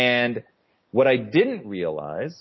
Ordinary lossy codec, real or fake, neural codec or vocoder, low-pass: MP3, 24 kbps; real; none; 7.2 kHz